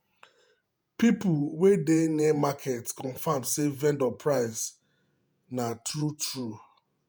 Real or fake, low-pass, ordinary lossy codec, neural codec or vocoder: fake; none; none; vocoder, 48 kHz, 128 mel bands, Vocos